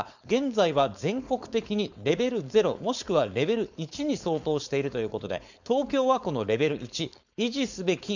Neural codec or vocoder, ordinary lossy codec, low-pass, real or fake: codec, 16 kHz, 4.8 kbps, FACodec; none; 7.2 kHz; fake